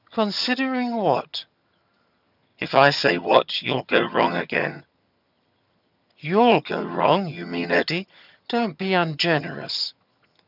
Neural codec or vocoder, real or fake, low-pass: vocoder, 22.05 kHz, 80 mel bands, HiFi-GAN; fake; 5.4 kHz